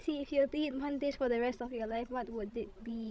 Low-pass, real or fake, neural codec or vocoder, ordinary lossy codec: none; fake; codec, 16 kHz, 16 kbps, FreqCodec, larger model; none